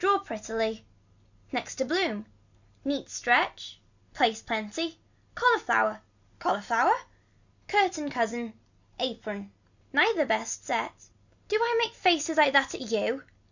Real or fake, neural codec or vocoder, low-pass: real; none; 7.2 kHz